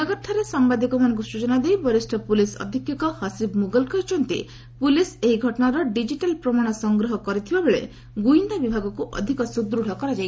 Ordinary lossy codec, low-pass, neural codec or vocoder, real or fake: none; none; none; real